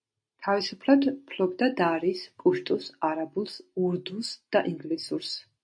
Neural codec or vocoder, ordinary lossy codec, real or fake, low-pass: none; MP3, 48 kbps; real; 9.9 kHz